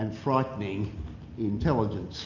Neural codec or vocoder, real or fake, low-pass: none; real; 7.2 kHz